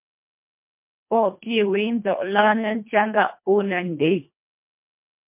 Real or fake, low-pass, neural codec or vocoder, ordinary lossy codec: fake; 3.6 kHz; codec, 24 kHz, 1.5 kbps, HILCodec; MP3, 32 kbps